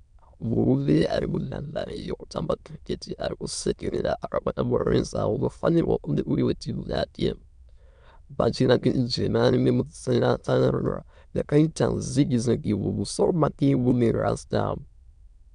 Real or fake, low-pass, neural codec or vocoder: fake; 9.9 kHz; autoencoder, 22.05 kHz, a latent of 192 numbers a frame, VITS, trained on many speakers